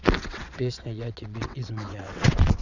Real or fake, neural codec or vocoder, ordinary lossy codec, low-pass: fake; vocoder, 22.05 kHz, 80 mel bands, WaveNeXt; none; 7.2 kHz